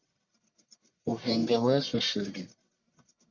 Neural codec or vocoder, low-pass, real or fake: codec, 44.1 kHz, 1.7 kbps, Pupu-Codec; 7.2 kHz; fake